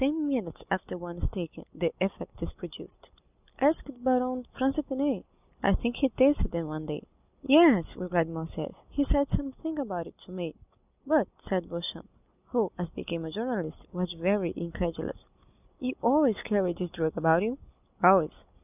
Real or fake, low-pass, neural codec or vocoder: real; 3.6 kHz; none